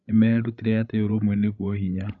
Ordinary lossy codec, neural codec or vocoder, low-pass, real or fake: none; codec, 16 kHz, 16 kbps, FreqCodec, larger model; 7.2 kHz; fake